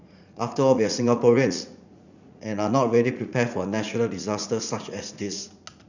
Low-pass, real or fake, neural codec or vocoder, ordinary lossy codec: 7.2 kHz; fake; vocoder, 44.1 kHz, 80 mel bands, Vocos; none